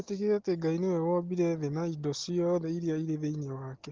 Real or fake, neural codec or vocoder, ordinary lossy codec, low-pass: real; none; Opus, 16 kbps; 7.2 kHz